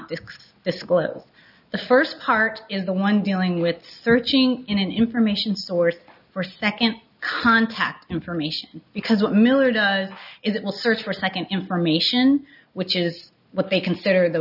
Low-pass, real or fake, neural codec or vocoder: 5.4 kHz; real; none